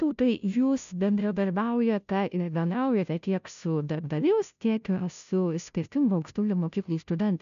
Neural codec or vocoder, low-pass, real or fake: codec, 16 kHz, 0.5 kbps, FunCodec, trained on Chinese and English, 25 frames a second; 7.2 kHz; fake